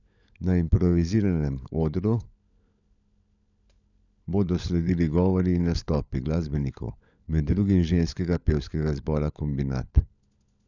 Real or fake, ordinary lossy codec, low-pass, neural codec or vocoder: fake; none; 7.2 kHz; codec, 16 kHz, 16 kbps, FunCodec, trained on LibriTTS, 50 frames a second